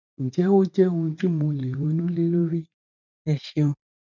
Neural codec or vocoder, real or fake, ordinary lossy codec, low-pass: vocoder, 44.1 kHz, 80 mel bands, Vocos; fake; none; 7.2 kHz